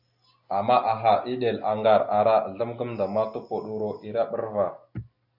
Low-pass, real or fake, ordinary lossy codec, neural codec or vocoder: 5.4 kHz; real; AAC, 48 kbps; none